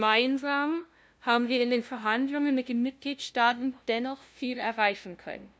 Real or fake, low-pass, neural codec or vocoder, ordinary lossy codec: fake; none; codec, 16 kHz, 0.5 kbps, FunCodec, trained on LibriTTS, 25 frames a second; none